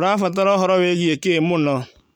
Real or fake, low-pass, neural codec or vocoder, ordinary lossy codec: real; 19.8 kHz; none; none